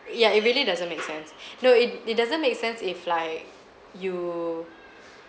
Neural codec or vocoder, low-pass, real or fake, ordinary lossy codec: none; none; real; none